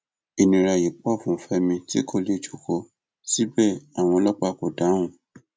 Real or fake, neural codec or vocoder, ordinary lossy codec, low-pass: real; none; none; none